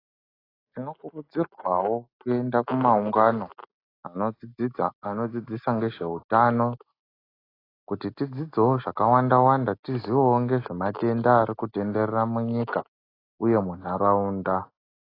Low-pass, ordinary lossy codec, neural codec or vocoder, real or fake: 5.4 kHz; AAC, 24 kbps; none; real